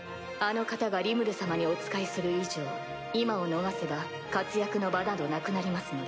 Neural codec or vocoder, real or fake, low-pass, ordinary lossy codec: none; real; none; none